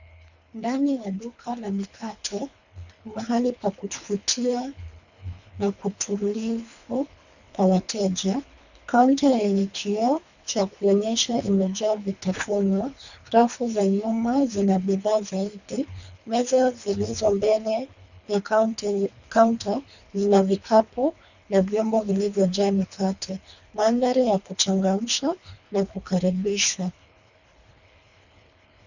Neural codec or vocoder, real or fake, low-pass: codec, 24 kHz, 3 kbps, HILCodec; fake; 7.2 kHz